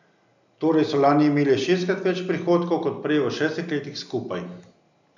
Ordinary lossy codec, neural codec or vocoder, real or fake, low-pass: none; none; real; 7.2 kHz